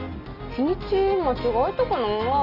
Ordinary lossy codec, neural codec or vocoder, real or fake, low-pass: Opus, 24 kbps; none; real; 5.4 kHz